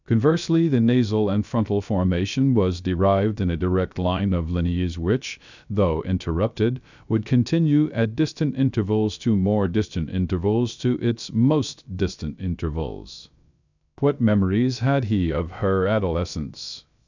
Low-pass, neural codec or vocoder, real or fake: 7.2 kHz; codec, 16 kHz, 0.3 kbps, FocalCodec; fake